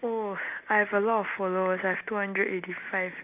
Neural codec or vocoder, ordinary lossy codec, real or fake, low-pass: none; none; real; 3.6 kHz